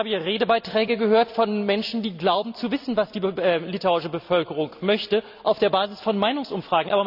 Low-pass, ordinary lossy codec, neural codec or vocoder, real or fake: 5.4 kHz; none; none; real